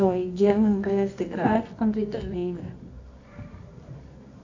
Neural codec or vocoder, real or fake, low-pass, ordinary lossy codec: codec, 24 kHz, 0.9 kbps, WavTokenizer, medium music audio release; fake; 7.2 kHz; AAC, 48 kbps